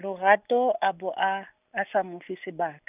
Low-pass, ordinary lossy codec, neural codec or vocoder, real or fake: 3.6 kHz; none; none; real